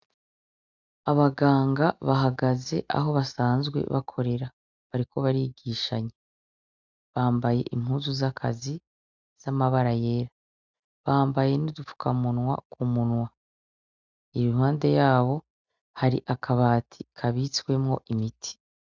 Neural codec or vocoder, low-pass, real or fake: none; 7.2 kHz; real